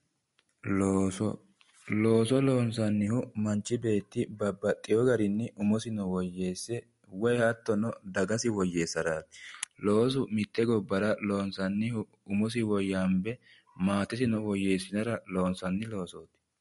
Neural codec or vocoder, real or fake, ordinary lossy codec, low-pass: none; real; MP3, 48 kbps; 19.8 kHz